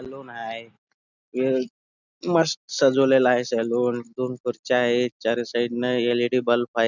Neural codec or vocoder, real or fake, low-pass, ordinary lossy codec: none; real; 7.2 kHz; none